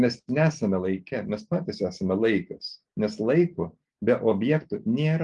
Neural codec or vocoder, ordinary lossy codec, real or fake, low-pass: none; Opus, 32 kbps; real; 10.8 kHz